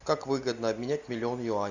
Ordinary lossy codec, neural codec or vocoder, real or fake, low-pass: Opus, 64 kbps; none; real; 7.2 kHz